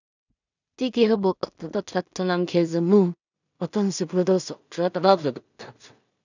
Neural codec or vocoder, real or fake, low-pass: codec, 16 kHz in and 24 kHz out, 0.4 kbps, LongCat-Audio-Codec, two codebook decoder; fake; 7.2 kHz